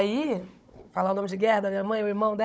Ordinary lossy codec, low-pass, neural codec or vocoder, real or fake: none; none; codec, 16 kHz, 16 kbps, FunCodec, trained on Chinese and English, 50 frames a second; fake